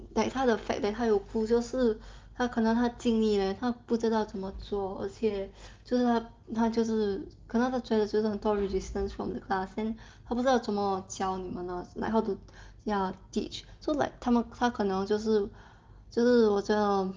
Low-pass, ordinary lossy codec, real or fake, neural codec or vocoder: 7.2 kHz; Opus, 24 kbps; real; none